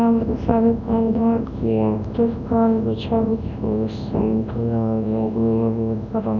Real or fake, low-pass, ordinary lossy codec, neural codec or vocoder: fake; 7.2 kHz; none; codec, 24 kHz, 0.9 kbps, WavTokenizer, large speech release